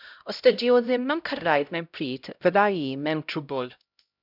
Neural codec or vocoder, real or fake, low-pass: codec, 16 kHz, 0.5 kbps, X-Codec, HuBERT features, trained on LibriSpeech; fake; 5.4 kHz